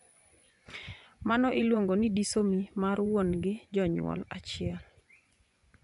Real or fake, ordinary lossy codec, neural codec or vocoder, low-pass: fake; none; vocoder, 24 kHz, 100 mel bands, Vocos; 10.8 kHz